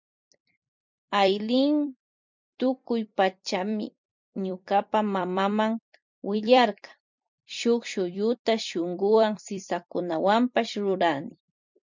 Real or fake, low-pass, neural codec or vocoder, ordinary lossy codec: fake; 7.2 kHz; vocoder, 44.1 kHz, 128 mel bands every 256 samples, BigVGAN v2; MP3, 64 kbps